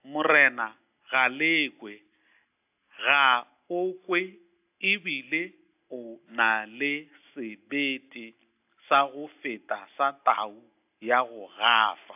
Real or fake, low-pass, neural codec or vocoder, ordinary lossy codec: real; 3.6 kHz; none; AAC, 32 kbps